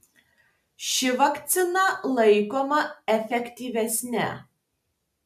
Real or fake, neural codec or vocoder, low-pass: real; none; 14.4 kHz